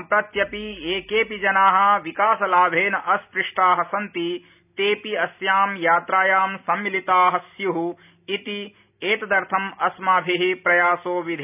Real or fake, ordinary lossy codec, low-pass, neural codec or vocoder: real; none; 3.6 kHz; none